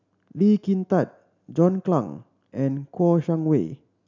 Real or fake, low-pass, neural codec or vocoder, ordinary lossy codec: real; 7.2 kHz; none; none